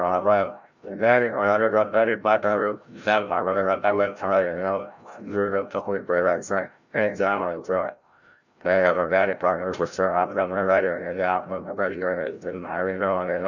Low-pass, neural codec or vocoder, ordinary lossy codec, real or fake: 7.2 kHz; codec, 16 kHz, 0.5 kbps, FreqCodec, larger model; none; fake